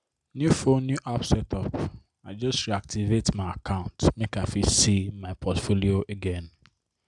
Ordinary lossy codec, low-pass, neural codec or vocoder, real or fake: none; 10.8 kHz; none; real